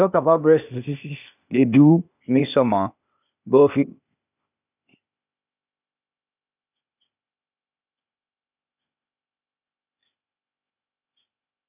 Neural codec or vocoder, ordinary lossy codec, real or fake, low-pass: codec, 16 kHz, 0.8 kbps, ZipCodec; none; fake; 3.6 kHz